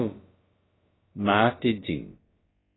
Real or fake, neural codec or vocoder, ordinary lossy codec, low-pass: fake; codec, 16 kHz, about 1 kbps, DyCAST, with the encoder's durations; AAC, 16 kbps; 7.2 kHz